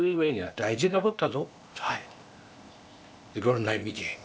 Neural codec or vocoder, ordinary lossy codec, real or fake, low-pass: codec, 16 kHz, 0.8 kbps, ZipCodec; none; fake; none